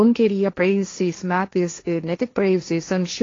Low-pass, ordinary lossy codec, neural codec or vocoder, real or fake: 7.2 kHz; AAC, 32 kbps; codec, 16 kHz, 1.1 kbps, Voila-Tokenizer; fake